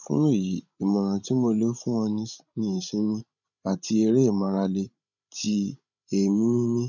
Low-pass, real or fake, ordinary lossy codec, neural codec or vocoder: 7.2 kHz; fake; none; codec, 16 kHz, 16 kbps, FreqCodec, larger model